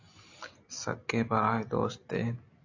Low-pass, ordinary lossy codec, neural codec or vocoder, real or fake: 7.2 kHz; AAC, 48 kbps; none; real